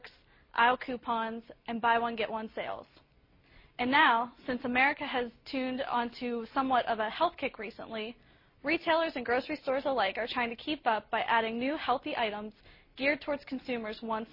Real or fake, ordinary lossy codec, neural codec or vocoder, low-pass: real; MP3, 24 kbps; none; 5.4 kHz